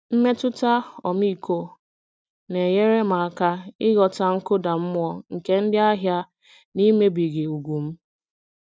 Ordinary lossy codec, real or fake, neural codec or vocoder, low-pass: none; real; none; none